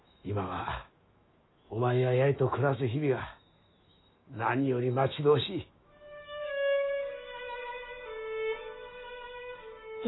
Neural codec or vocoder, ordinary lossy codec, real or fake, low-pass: autoencoder, 48 kHz, 128 numbers a frame, DAC-VAE, trained on Japanese speech; AAC, 16 kbps; fake; 7.2 kHz